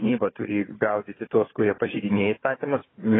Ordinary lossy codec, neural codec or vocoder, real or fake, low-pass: AAC, 16 kbps; codec, 16 kHz, 4 kbps, FunCodec, trained on Chinese and English, 50 frames a second; fake; 7.2 kHz